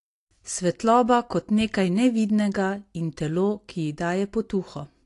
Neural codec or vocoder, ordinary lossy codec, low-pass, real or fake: none; AAC, 48 kbps; 10.8 kHz; real